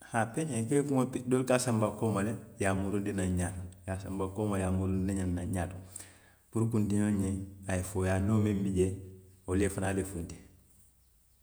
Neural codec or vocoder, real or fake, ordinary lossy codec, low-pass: vocoder, 48 kHz, 128 mel bands, Vocos; fake; none; none